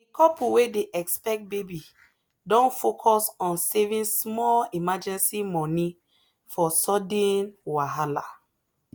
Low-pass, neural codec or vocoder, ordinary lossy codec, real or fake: none; none; none; real